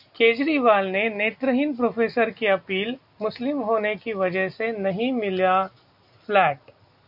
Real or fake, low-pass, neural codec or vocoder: real; 5.4 kHz; none